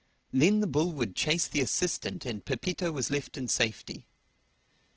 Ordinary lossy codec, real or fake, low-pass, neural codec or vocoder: Opus, 16 kbps; real; 7.2 kHz; none